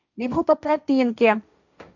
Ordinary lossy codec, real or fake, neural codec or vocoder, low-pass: none; fake; codec, 16 kHz, 1.1 kbps, Voila-Tokenizer; 7.2 kHz